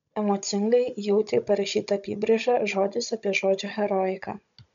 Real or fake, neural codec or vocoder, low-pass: fake; codec, 16 kHz, 16 kbps, FunCodec, trained on Chinese and English, 50 frames a second; 7.2 kHz